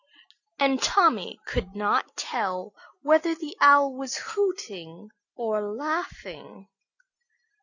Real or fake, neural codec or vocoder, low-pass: real; none; 7.2 kHz